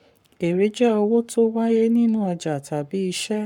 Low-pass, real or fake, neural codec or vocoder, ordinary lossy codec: 19.8 kHz; fake; codec, 44.1 kHz, 7.8 kbps, Pupu-Codec; none